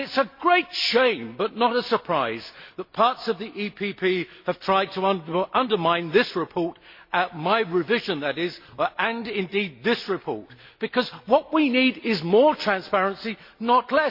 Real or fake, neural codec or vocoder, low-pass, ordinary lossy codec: real; none; 5.4 kHz; MP3, 32 kbps